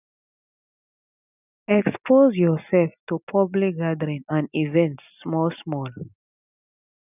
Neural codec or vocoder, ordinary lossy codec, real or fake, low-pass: none; none; real; 3.6 kHz